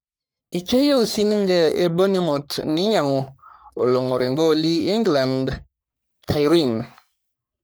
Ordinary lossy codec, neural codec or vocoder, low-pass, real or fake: none; codec, 44.1 kHz, 3.4 kbps, Pupu-Codec; none; fake